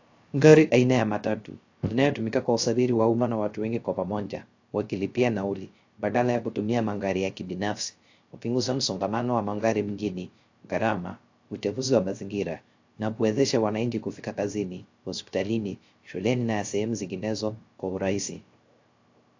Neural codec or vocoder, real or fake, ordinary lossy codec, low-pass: codec, 16 kHz, 0.3 kbps, FocalCodec; fake; AAC, 48 kbps; 7.2 kHz